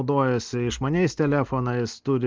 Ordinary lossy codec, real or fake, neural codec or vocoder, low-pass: Opus, 16 kbps; real; none; 7.2 kHz